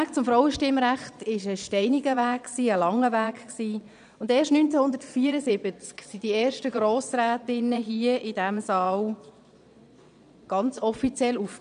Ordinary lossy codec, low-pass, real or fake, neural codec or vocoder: none; 9.9 kHz; fake; vocoder, 22.05 kHz, 80 mel bands, Vocos